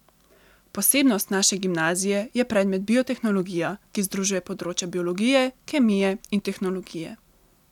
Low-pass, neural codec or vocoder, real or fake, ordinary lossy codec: 19.8 kHz; none; real; none